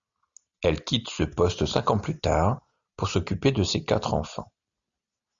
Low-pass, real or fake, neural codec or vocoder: 7.2 kHz; real; none